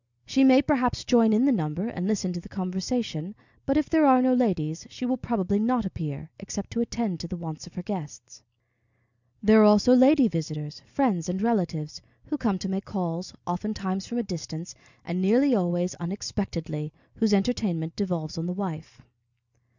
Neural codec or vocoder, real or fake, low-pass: none; real; 7.2 kHz